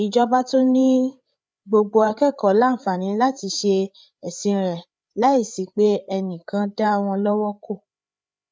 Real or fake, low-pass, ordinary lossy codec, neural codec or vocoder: fake; none; none; codec, 16 kHz, 8 kbps, FreqCodec, larger model